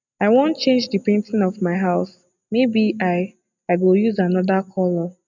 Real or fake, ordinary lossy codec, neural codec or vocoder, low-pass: real; none; none; 7.2 kHz